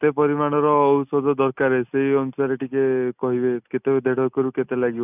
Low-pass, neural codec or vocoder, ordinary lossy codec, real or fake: 3.6 kHz; none; none; real